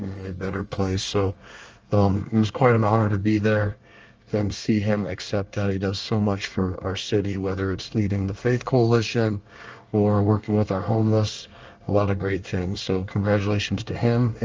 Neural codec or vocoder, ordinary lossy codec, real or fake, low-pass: codec, 24 kHz, 1 kbps, SNAC; Opus, 24 kbps; fake; 7.2 kHz